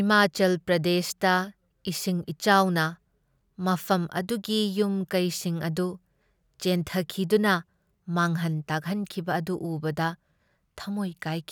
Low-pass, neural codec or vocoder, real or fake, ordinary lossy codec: none; none; real; none